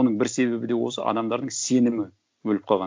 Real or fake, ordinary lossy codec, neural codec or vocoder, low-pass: real; none; none; none